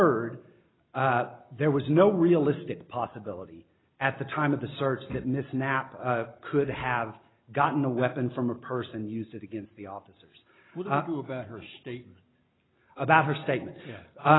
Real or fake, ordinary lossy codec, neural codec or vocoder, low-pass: real; AAC, 16 kbps; none; 7.2 kHz